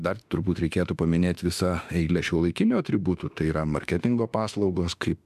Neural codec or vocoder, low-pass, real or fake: autoencoder, 48 kHz, 32 numbers a frame, DAC-VAE, trained on Japanese speech; 14.4 kHz; fake